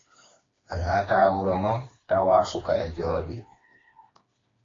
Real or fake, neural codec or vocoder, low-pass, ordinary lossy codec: fake; codec, 16 kHz, 2 kbps, FreqCodec, smaller model; 7.2 kHz; AAC, 32 kbps